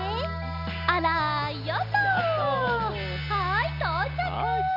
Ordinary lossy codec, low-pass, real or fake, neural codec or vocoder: none; 5.4 kHz; real; none